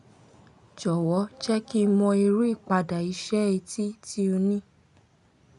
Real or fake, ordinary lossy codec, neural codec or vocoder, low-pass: real; none; none; 10.8 kHz